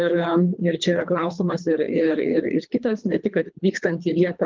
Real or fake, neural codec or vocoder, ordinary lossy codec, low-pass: fake; codec, 24 kHz, 3 kbps, HILCodec; Opus, 24 kbps; 7.2 kHz